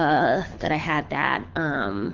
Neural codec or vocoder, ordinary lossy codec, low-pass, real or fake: codec, 24 kHz, 6 kbps, HILCodec; Opus, 32 kbps; 7.2 kHz; fake